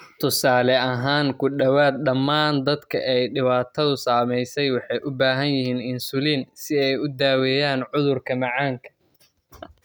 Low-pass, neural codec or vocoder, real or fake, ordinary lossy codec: none; none; real; none